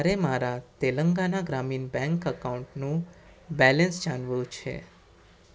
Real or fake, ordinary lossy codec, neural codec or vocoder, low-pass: real; none; none; none